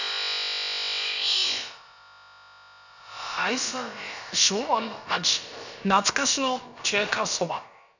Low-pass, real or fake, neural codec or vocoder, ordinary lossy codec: 7.2 kHz; fake; codec, 16 kHz, about 1 kbps, DyCAST, with the encoder's durations; none